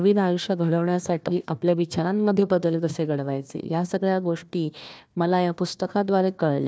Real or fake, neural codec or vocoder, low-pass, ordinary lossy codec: fake; codec, 16 kHz, 1 kbps, FunCodec, trained on Chinese and English, 50 frames a second; none; none